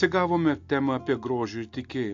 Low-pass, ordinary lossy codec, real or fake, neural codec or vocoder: 7.2 kHz; AAC, 64 kbps; real; none